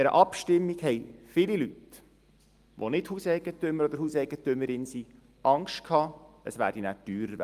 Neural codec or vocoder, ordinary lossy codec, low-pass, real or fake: none; Opus, 32 kbps; 14.4 kHz; real